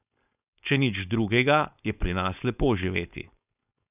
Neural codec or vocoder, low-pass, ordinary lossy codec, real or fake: codec, 16 kHz, 4.8 kbps, FACodec; 3.6 kHz; none; fake